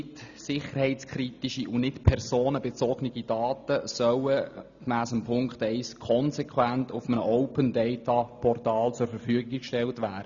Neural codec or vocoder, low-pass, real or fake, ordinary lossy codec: none; 7.2 kHz; real; none